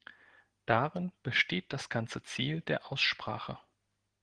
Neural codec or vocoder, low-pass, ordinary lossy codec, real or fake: none; 9.9 kHz; Opus, 16 kbps; real